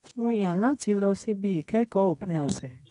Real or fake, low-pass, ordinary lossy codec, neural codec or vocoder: fake; 10.8 kHz; none; codec, 24 kHz, 0.9 kbps, WavTokenizer, medium music audio release